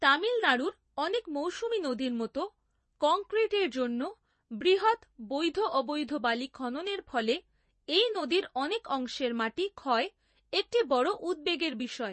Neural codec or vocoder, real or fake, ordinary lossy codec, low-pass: vocoder, 24 kHz, 100 mel bands, Vocos; fake; MP3, 32 kbps; 10.8 kHz